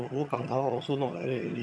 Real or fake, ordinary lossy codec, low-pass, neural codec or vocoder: fake; none; none; vocoder, 22.05 kHz, 80 mel bands, HiFi-GAN